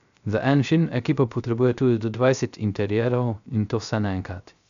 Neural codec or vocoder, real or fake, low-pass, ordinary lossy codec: codec, 16 kHz, 0.3 kbps, FocalCodec; fake; 7.2 kHz; MP3, 96 kbps